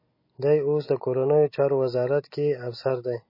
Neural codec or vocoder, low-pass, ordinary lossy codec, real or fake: none; 5.4 kHz; MP3, 24 kbps; real